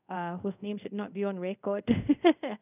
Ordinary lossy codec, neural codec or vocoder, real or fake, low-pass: none; codec, 24 kHz, 0.5 kbps, DualCodec; fake; 3.6 kHz